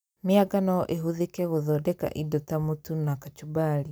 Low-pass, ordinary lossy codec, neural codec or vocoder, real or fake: none; none; none; real